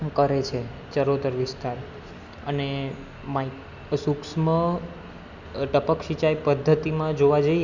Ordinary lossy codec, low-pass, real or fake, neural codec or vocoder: none; 7.2 kHz; real; none